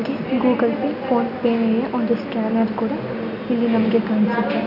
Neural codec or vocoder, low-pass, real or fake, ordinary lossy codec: none; 5.4 kHz; real; none